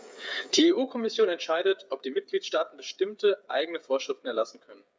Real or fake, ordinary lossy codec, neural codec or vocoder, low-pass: fake; none; codec, 16 kHz, 8 kbps, FreqCodec, smaller model; none